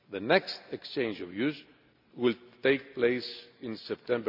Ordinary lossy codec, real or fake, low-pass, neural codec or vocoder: none; real; 5.4 kHz; none